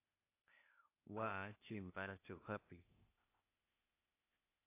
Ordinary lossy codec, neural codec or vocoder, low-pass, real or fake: AAC, 24 kbps; codec, 16 kHz, 0.8 kbps, ZipCodec; 3.6 kHz; fake